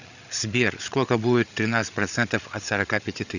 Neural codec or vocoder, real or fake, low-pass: codec, 16 kHz, 8 kbps, FreqCodec, larger model; fake; 7.2 kHz